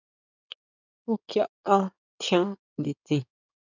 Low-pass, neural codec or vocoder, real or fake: 7.2 kHz; codec, 16 kHz in and 24 kHz out, 2.2 kbps, FireRedTTS-2 codec; fake